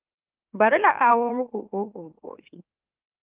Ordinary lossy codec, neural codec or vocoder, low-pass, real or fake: Opus, 24 kbps; autoencoder, 44.1 kHz, a latent of 192 numbers a frame, MeloTTS; 3.6 kHz; fake